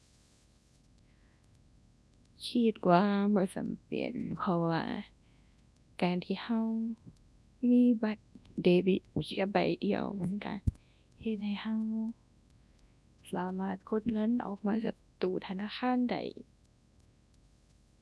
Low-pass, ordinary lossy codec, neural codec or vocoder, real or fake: none; none; codec, 24 kHz, 0.9 kbps, WavTokenizer, large speech release; fake